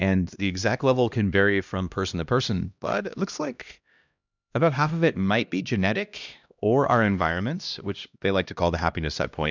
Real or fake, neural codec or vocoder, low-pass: fake; codec, 16 kHz, 1 kbps, X-Codec, HuBERT features, trained on LibriSpeech; 7.2 kHz